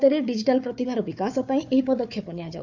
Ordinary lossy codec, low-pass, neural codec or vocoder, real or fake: none; 7.2 kHz; codec, 16 kHz, 4 kbps, FunCodec, trained on Chinese and English, 50 frames a second; fake